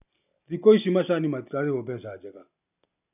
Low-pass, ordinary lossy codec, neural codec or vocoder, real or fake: 3.6 kHz; none; none; real